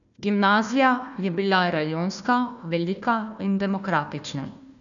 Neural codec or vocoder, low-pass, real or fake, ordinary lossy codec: codec, 16 kHz, 1 kbps, FunCodec, trained on Chinese and English, 50 frames a second; 7.2 kHz; fake; none